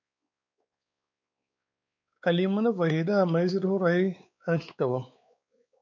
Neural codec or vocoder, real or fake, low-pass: codec, 16 kHz, 4 kbps, X-Codec, WavLM features, trained on Multilingual LibriSpeech; fake; 7.2 kHz